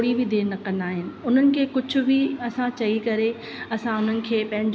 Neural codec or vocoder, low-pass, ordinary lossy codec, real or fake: none; none; none; real